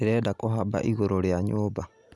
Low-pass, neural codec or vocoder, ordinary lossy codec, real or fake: none; none; none; real